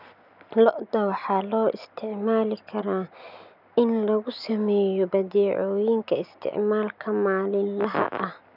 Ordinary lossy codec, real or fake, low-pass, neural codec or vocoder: none; real; 5.4 kHz; none